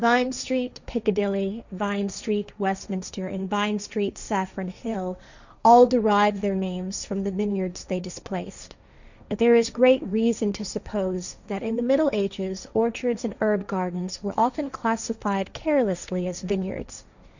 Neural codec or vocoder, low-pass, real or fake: codec, 16 kHz, 1.1 kbps, Voila-Tokenizer; 7.2 kHz; fake